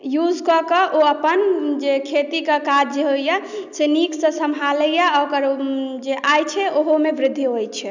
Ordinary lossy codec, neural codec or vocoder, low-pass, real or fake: none; none; 7.2 kHz; real